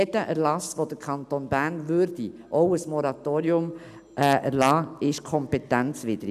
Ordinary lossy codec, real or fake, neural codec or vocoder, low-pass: none; real; none; 14.4 kHz